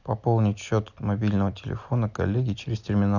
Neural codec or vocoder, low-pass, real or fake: none; 7.2 kHz; real